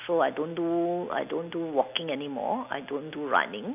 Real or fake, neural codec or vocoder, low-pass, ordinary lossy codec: real; none; 3.6 kHz; none